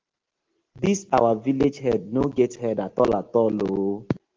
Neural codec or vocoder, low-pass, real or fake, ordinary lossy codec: none; 7.2 kHz; real; Opus, 16 kbps